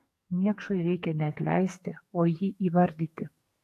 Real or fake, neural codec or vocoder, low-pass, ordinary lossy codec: fake; codec, 44.1 kHz, 2.6 kbps, SNAC; 14.4 kHz; AAC, 64 kbps